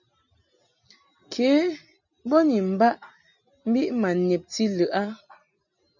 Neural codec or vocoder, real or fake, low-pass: none; real; 7.2 kHz